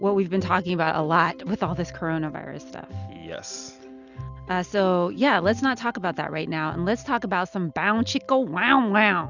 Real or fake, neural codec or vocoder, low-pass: real; none; 7.2 kHz